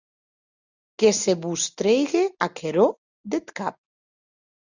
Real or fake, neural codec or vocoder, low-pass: real; none; 7.2 kHz